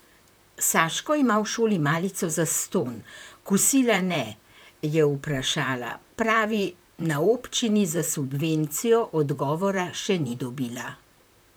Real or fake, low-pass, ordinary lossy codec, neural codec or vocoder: fake; none; none; vocoder, 44.1 kHz, 128 mel bands, Pupu-Vocoder